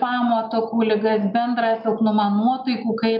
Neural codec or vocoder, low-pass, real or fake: none; 5.4 kHz; real